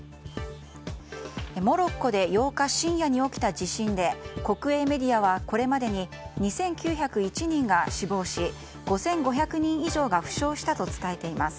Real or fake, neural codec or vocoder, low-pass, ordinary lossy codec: real; none; none; none